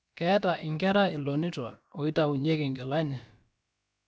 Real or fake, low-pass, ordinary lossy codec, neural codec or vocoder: fake; none; none; codec, 16 kHz, about 1 kbps, DyCAST, with the encoder's durations